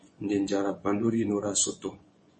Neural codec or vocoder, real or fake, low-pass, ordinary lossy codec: vocoder, 24 kHz, 100 mel bands, Vocos; fake; 10.8 kHz; MP3, 32 kbps